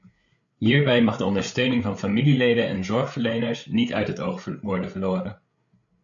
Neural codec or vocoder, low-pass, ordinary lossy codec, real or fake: codec, 16 kHz, 8 kbps, FreqCodec, larger model; 7.2 kHz; MP3, 96 kbps; fake